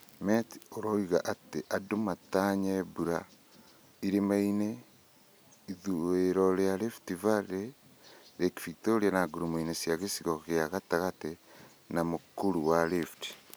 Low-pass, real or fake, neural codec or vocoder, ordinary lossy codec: none; real; none; none